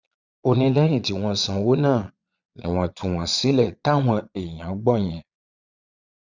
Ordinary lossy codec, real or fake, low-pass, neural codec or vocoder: Opus, 64 kbps; fake; 7.2 kHz; vocoder, 22.05 kHz, 80 mel bands, Vocos